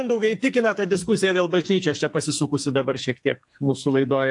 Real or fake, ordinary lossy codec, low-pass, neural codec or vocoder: fake; AAC, 64 kbps; 10.8 kHz; codec, 44.1 kHz, 2.6 kbps, SNAC